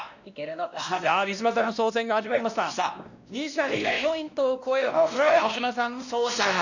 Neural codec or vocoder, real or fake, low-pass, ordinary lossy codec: codec, 16 kHz, 1 kbps, X-Codec, WavLM features, trained on Multilingual LibriSpeech; fake; 7.2 kHz; none